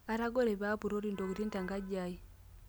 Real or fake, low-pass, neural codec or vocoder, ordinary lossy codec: real; none; none; none